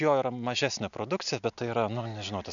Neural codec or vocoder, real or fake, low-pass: none; real; 7.2 kHz